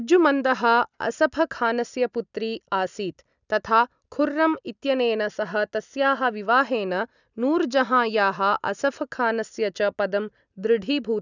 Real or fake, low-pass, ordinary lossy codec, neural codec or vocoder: real; 7.2 kHz; none; none